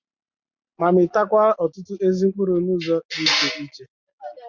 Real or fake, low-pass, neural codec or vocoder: real; 7.2 kHz; none